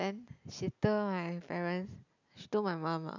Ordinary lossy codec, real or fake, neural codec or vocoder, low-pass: none; real; none; 7.2 kHz